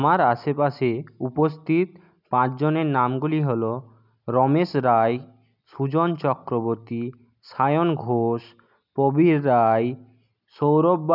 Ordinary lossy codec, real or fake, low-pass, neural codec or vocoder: none; real; 5.4 kHz; none